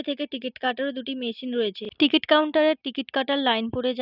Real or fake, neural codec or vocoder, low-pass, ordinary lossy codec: real; none; 5.4 kHz; none